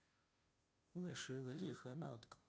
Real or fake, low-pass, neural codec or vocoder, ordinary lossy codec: fake; none; codec, 16 kHz, 0.5 kbps, FunCodec, trained on Chinese and English, 25 frames a second; none